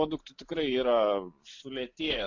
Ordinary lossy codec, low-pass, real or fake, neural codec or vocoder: MP3, 48 kbps; 7.2 kHz; real; none